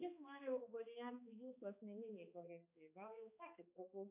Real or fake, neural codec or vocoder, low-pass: fake; codec, 16 kHz, 2 kbps, X-Codec, HuBERT features, trained on balanced general audio; 3.6 kHz